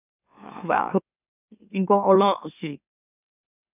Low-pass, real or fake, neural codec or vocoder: 3.6 kHz; fake; autoencoder, 44.1 kHz, a latent of 192 numbers a frame, MeloTTS